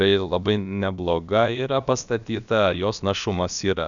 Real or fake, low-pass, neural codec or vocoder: fake; 7.2 kHz; codec, 16 kHz, about 1 kbps, DyCAST, with the encoder's durations